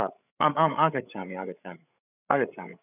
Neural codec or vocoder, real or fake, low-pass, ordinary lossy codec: codec, 16 kHz, 8 kbps, FreqCodec, larger model; fake; 3.6 kHz; none